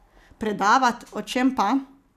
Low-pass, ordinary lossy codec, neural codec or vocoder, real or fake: 14.4 kHz; none; none; real